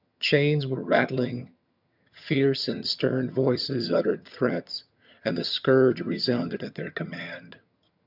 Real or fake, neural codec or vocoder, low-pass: fake; vocoder, 22.05 kHz, 80 mel bands, HiFi-GAN; 5.4 kHz